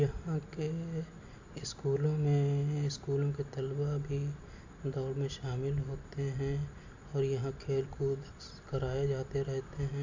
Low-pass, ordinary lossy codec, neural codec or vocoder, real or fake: 7.2 kHz; AAC, 48 kbps; none; real